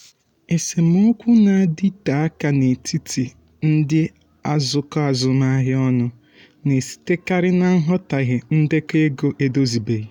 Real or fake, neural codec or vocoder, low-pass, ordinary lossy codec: real; none; 19.8 kHz; none